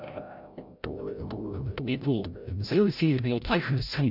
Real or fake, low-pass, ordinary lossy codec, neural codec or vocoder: fake; 5.4 kHz; none; codec, 16 kHz, 0.5 kbps, FreqCodec, larger model